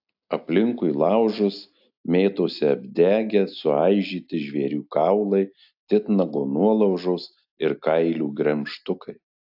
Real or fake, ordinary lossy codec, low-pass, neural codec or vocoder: real; AAC, 48 kbps; 5.4 kHz; none